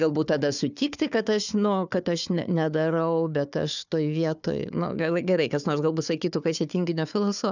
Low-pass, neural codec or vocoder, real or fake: 7.2 kHz; codec, 16 kHz, 4 kbps, FunCodec, trained on Chinese and English, 50 frames a second; fake